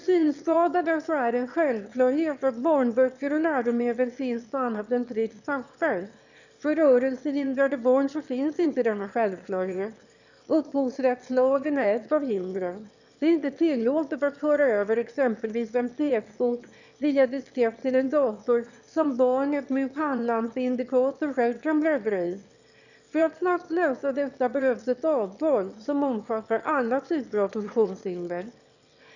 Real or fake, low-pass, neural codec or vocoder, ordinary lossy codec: fake; 7.2 kHz; autoencoder, 22.05 kHz, a latent of 192 numbers a frame, VITS, trained on one speaker; none